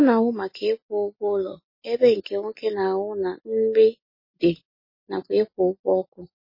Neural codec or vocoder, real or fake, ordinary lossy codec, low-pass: none; real; MP3, 24 kbps; 5.4 kHz